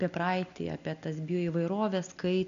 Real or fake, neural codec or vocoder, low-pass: real; none; 7.2 kHz